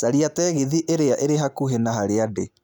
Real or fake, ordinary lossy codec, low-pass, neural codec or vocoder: real; none; none; none